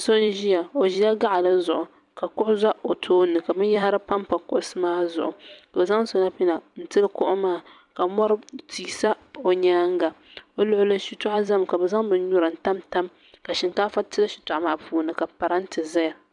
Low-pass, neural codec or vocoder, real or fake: 10.8 kHz; vocoder, 44.1 kHz, 128 mel bands every 256 samples, BigVGAN v2; fake